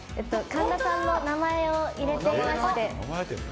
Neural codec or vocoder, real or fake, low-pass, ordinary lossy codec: none; real; none; none